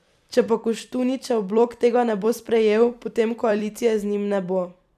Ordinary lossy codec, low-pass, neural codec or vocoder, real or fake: none; 14.4 kHz; vocoder, 44.1 kHz, 128 mel bands every 256 samples, BigVGAN v2; fake